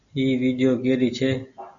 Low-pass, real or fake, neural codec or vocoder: 7.2 kHz; real; none